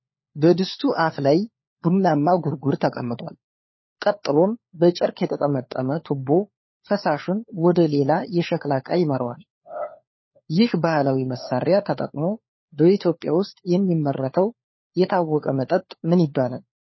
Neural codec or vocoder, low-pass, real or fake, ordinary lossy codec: codec, 16 kHz, 4 kbps, FunCodec, trained on LibriTTS, 50 frames a second; 7.2 kHz; fake; MP3, 24 kbps